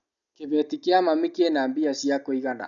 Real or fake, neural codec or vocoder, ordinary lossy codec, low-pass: real; none; none; 7.2 kHz